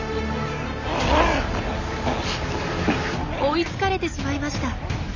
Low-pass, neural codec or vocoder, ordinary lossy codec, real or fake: 7.2 kHz; none; none; real